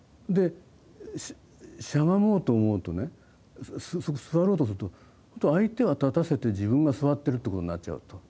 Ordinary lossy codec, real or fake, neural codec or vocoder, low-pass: none; real; none; none